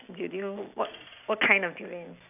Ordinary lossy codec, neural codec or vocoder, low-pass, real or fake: none; none; 3.6 kHz; real